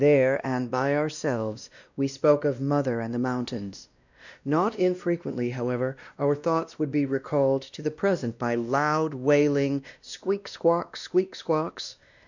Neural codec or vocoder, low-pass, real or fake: codec, 16 kHz, 1 kbps, X-Codec, WavLM features, trained on Multilingual LibriSpeech; 7.2 kHz; fake